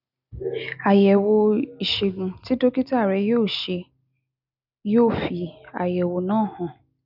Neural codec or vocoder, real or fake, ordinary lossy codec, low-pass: none; real; none; 5.4 kHz